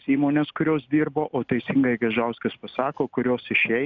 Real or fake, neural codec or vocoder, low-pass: real; none; 7.2 kHz